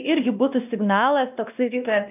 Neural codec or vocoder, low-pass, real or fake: codec, 16 kHz, 1 kbps, X-Codec, WavLM features, trained on Multilingual LibriSpeech; 3.6 kHz; fake